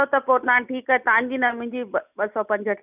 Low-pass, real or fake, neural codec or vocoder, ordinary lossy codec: 3.6 kHz; real; none; none